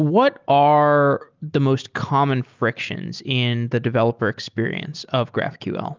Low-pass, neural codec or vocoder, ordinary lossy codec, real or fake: 7.2 kHz; none; Opus, 32 kbps; real